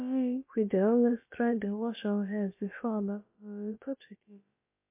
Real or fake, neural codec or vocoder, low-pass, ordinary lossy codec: fake; codec, 16 kHz, about 1 kbps, DyCAST, with the encoder's durations; 3.6 kHz; MP3, 24 kbps